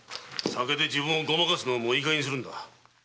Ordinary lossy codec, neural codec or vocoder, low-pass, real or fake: none; none; none; real